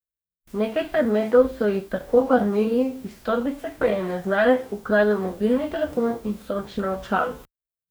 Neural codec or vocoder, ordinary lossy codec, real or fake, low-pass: codec, 44.1 kHz, 2.6 kbps, DAC; none; fake; none